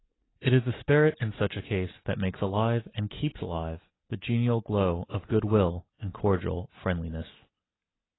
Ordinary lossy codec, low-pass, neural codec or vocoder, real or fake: AAC, 16 kbps; 7.2 kHz; none; real